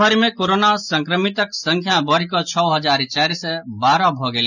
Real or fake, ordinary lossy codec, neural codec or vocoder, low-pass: real; none; none; 7.2 kHz